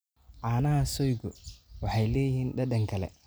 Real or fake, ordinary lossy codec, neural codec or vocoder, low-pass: real; none; none; none